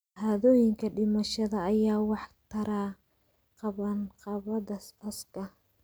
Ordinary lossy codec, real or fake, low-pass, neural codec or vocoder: none; real; none; none